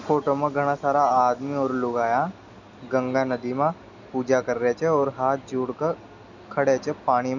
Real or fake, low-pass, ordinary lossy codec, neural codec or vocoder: real; 7.2 kHz; none; none